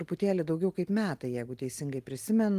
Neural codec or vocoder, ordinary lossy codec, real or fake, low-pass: none; Opus, 24 kbps; real; 14.4 kHz